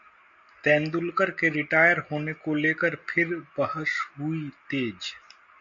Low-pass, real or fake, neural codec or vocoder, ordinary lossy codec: 7.2 kHz; real; none; MP3, 48 kbps